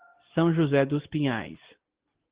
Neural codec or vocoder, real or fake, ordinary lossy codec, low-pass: none; real; Opus, 16 kbps; 3.6 kHz